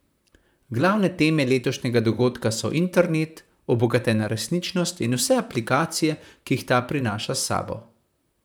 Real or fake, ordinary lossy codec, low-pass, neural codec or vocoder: fake; none; none; vocoder, 44.1 kHz, 128 mel bands, Pupu-Vocoder